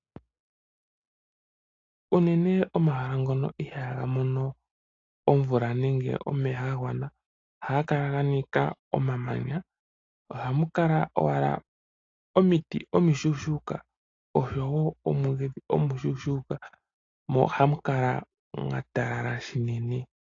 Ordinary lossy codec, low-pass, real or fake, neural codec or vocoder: AAC, 32 kbps; 7.2 kHz; real; none